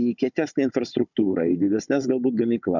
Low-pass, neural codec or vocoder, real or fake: 7.2 kHz; codec, 16 kHz, 16 kbps, FunCodec, trained on Chinese and English, 50 frames a second; fake